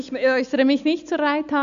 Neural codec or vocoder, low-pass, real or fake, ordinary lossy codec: none; 7.2 kHz; real; AAC, 96 kbps